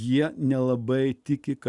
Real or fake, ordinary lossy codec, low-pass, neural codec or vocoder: real; Opus, 64 kbps; 10.8 kHz; none